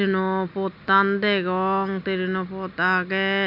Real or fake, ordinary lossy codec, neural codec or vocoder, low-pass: real; none; none; 5.4 kHz